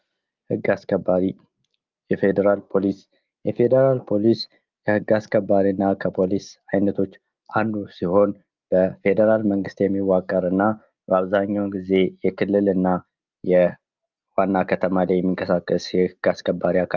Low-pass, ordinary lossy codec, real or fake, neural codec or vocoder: 7.2 kHz; Opus, 24 kbps; real; none